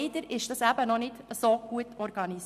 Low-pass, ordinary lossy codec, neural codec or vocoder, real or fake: 14.4 kHz; none; none; real